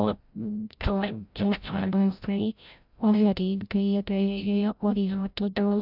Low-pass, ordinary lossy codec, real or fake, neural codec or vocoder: 5.4 kHz; none; fake; codec, 16 kHz, 0.5 kbps, FreqCodec, larger model